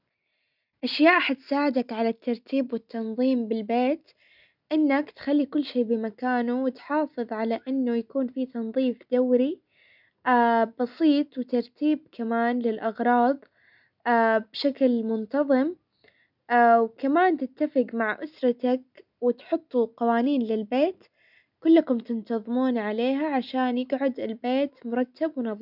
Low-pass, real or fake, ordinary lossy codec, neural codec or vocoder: 5.4 kHz; real; none; none